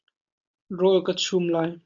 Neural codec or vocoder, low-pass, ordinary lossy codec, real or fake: none; 7.2 kHz; MP3, 64 kbps; real